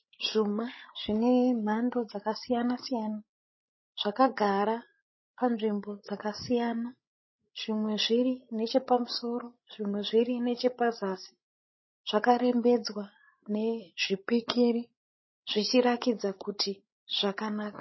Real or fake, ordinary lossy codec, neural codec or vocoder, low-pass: fake; MP3, 24 kbps; codec, 16 kHz, 16 kbps, FreqCodec, larger model; 7.2 kHz